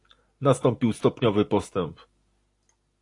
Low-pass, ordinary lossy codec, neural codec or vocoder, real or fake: 10.8 kHz; AAC, 48 kbps; none; real